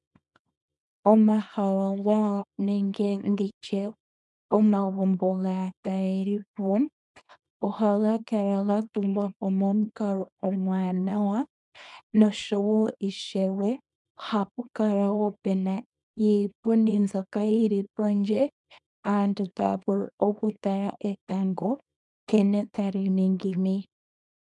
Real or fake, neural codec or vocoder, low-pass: fake; codec, 24 kHz, 0.9 kbps, WavTokenizer, small release; 10.8 kHz